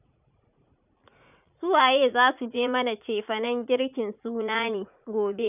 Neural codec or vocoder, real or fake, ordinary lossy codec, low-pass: vocoder, 44.1 kHz, 80 mel bands, Vocos; fake; none; 3.6 kHz